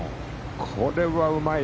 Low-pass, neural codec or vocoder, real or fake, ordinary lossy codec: none; none; real; none